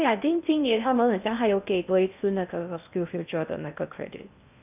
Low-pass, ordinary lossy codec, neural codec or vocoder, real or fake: 3.6 kHz; none; codec, 16 kHz in and 24 kHz out, 0.6 kbps, FocalCodec, streaming, 2048 codes; fake